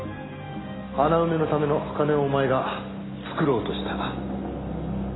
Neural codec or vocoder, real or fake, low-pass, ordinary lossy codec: none; real; 7.2 kHz; AAC, 16 kbps